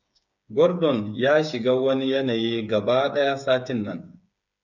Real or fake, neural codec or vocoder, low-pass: fake; codec, 16 kHz, 8 kbps, FreqCodec, smaller model; 7.2 kHz